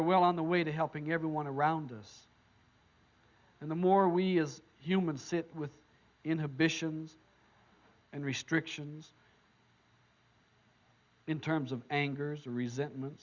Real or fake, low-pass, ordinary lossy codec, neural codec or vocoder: real; 7.2 kHz; Opus, 64 kbps; none